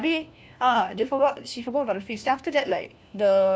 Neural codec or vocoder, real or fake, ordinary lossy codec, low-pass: codec, 16 kHz, 1 kbps, FunCodec, trained on LibriTTS, 50 frames a second; fake; none; none